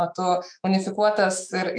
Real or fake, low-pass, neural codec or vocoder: fake; 9.9 kHz; autoencoder, 48 kHz, 128 numbers a frame, DAC-VAE, trained on Japanese speech